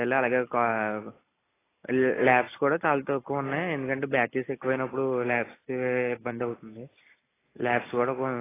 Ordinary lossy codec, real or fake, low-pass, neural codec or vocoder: AAC, 16 kbps; real; 3.6 kHz; none